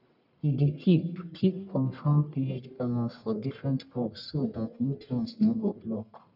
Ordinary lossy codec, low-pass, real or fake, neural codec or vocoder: none; 5.4 kHz; fake; codec, 44.1 kHz, 1.7 kbps, Pupu-Codec